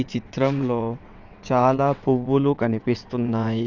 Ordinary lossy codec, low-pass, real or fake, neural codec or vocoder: none; 7.2 kHz; fake; vocoder, 22.05 kHz, 80 mel bands, WaveNeXt